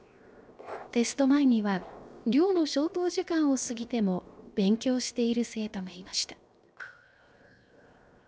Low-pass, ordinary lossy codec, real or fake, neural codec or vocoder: none; none; fake; codec, 16 kHz, 0.7 kbps, FocalCodec